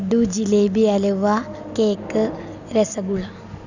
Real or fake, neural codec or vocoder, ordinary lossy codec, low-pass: real; none; none; 7.2 kHz